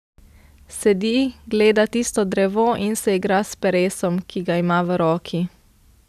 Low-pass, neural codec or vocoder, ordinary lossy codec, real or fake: 14.4 kHz; none; none; real